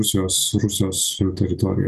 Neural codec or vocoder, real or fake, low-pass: none; real; 14.4 kHz